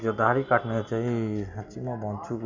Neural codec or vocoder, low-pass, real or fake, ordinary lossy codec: none; 7.2 kHz; real; none